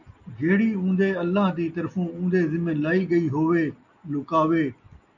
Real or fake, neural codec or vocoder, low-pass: real; none; 7.2 kHz